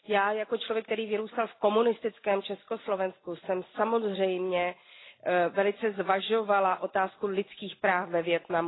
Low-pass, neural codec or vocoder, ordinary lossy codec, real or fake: 7.2 kHz; none; AAC, 16 kbps; real